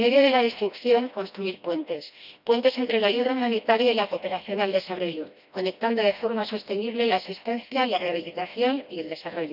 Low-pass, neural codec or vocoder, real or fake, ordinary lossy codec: 5.4 kHz; codec, 16 kHz, 1 kbps, FreqCodec, smaller model; fake; none